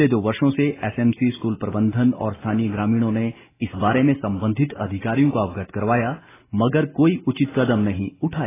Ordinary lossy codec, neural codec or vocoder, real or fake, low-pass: AAC, 16 kbps; none; real; 3.6 kHz